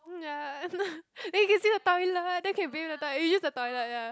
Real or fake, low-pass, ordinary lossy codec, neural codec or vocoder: real; none; none; none